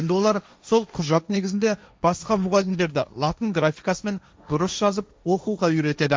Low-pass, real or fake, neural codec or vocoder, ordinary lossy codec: none; fake; codec, 16 kHz, 1.1 kbps, Voila-Tokenizer; none